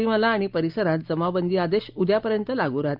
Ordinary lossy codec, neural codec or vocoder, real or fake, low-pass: Opus, 24 kbps; none; real; 5.4 kHz